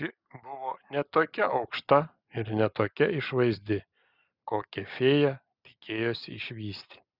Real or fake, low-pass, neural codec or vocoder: real; 5.4 kHz; none